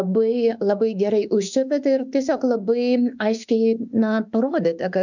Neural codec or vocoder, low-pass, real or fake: autoencoder, 48 kHz, 32 numbers a frame, DAC-VAE, trained on Japanese speech; 7.2 kHz; fake